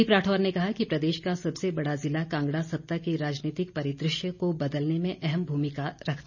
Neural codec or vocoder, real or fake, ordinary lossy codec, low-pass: none; real; none; 7.2 kHz